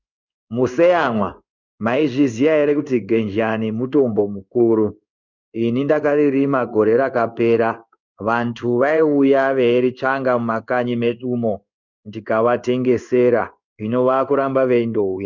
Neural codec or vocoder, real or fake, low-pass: codec, 16 kHz in and 24 kHz out, 1 kbps, XY-Tokenizer; fake; 7.2 kHz